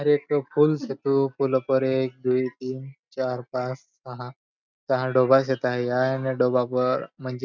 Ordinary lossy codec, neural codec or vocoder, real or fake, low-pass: none; none; real; 7.2 kHz